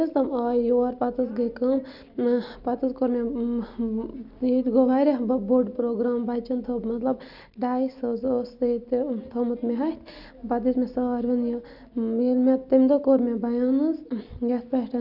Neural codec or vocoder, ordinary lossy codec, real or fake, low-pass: none; none; real; 5.4 kHz